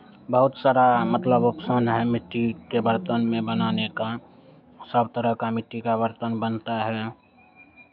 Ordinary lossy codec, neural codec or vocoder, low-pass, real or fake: none; autoencoder, 48 kHz, 128 numbers a frame, DAC-VAE, trained on Japanese speech; 5.4 kHz; fake